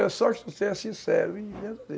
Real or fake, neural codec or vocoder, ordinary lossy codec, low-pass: real; none; none; none